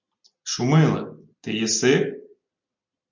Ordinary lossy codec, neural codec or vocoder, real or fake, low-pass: MP3, 64 kbps; none; real; 7.2 kHz